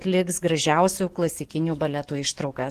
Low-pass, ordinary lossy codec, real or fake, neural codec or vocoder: 14.4 kHz; Opus, 16 kbps; fake; codec, 44.1 kHz, 7.8 kbps, DAC